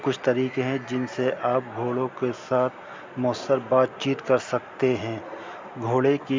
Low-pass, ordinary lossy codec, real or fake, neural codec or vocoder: 7.2 kHz; MP3, 64 kbps; real; none